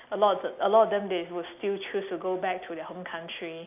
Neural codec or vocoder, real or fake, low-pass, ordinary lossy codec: none; real; 3.6 kHz; none